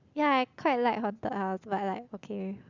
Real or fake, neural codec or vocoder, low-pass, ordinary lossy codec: real; none; 7.2 kHz; Opus, 64 kbps